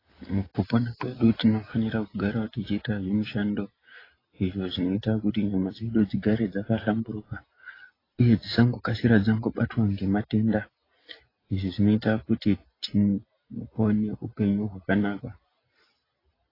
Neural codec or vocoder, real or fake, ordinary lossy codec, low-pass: none; real; AAC, 24 kbps; 5.4 kHz